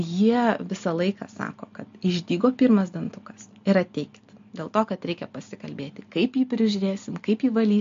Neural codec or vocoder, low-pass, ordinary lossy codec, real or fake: none; 7.2 kHz; MP3, 48 kbps; real